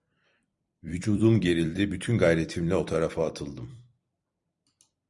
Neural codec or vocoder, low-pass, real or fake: vocoder, 44.1 kHz, 128 mel bands every 256 samples, BigVGAN v2; 10.8 kHz; fake